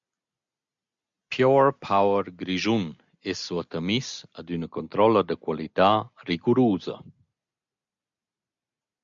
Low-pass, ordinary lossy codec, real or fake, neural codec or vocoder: 7.2 kHz; MP3, 64 kbps; real; none